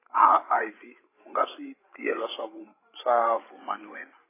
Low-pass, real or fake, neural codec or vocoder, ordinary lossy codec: 3.6 kHz; fake; codec, 16 kHz, 8 kbps, FreqCodec, larger model; AAC, 16 kbps